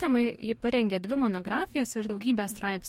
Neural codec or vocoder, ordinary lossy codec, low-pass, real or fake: codec, 44.1 kHz, 2.6 kbps, DAC; MP3, 64 kbps; 19.8 kHz; fake